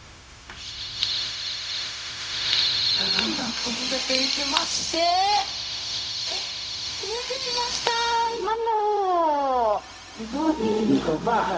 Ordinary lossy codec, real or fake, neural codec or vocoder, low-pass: none; fake; codec, 16 kHz, 0.4 kbps, LongCat-Audio-Codec; none